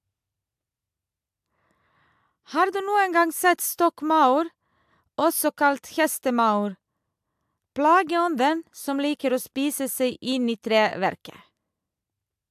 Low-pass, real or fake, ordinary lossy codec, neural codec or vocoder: 14.4 kHz; real; AAC, 96 kbps; none